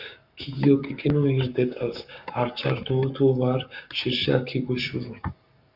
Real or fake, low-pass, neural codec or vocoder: fake; 5.4 kHz; codec, 44.1 kHz, 7.8 kbps, Pupu-Codec